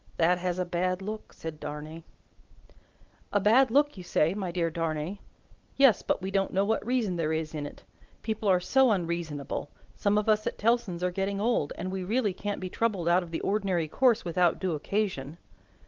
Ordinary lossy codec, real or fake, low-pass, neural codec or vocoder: Opus, 32 kbps; real; 7.2 kHz; none